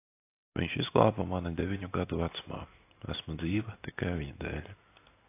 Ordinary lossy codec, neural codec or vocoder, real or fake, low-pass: AAC, 24 kbps; none; real; 3.6 kHz